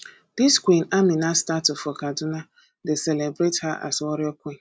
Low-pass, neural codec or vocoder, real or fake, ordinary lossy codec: none; none; real; none